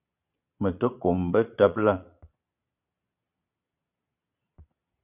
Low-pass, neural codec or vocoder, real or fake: 3.6 kHz; vocoder, 22.05 kHz, 80 mel bands, Vocos; fake